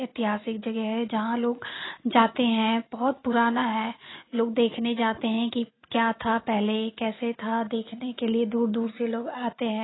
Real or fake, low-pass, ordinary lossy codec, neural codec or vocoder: real; 7.2 kHz; AAC, 16 kbps; none